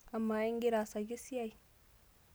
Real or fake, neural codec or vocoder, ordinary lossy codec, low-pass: real; none; none; none